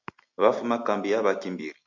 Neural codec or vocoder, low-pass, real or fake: none; 7.2 kHz; real